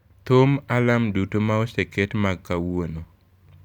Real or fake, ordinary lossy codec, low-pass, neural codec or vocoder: fake; none; 19.8 kHz; vocoder, 44.1 kHz, 128 mel bands every 256 samples, BigVGAN v2